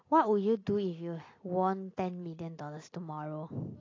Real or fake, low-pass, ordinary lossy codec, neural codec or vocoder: real; 7.2 kHz; AAC, 32 kbps; none